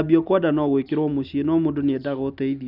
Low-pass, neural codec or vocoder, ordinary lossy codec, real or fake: 5.4 kHz; none; none; real